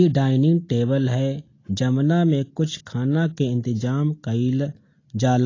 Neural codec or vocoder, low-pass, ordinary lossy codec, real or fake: none; 7.2 kHz; AAC, 32 kbps; real